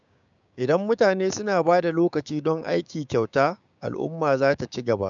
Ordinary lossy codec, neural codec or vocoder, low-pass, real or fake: none; codec, 16 kHz, 6 kbps, DAC; 7.2 kHz; fake